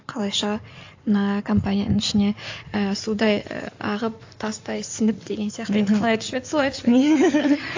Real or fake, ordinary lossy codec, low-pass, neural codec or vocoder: fake; AAC, 48 kbps; 7.2 kHz; codec, 16 kHz in and 24 kHz out, 2.2 kbps, FireRedTTS-2 codec